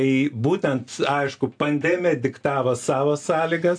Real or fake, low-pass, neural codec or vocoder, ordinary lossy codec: real; 9.9 kHz; none; AAC, 64 kbps